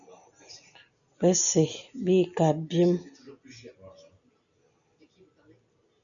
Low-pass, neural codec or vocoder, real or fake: 7.2 kHz; none; real